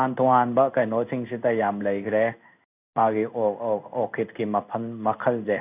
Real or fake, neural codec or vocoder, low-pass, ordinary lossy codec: fake; codec, 16 kHz in and 24 kHz out, 1 kbps, XY-Tokenizer; 3.6 kHz; none